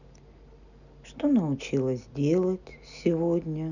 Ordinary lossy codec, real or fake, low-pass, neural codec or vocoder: none; real; 7.2 kHz; none